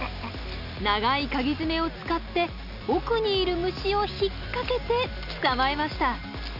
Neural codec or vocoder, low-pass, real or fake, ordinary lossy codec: none; 5.4 kHz; real; none